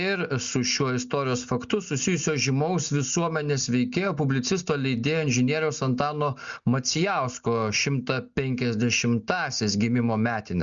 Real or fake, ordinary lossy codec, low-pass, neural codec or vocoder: real; Opus, 64 kbps; 7.2 kHz; none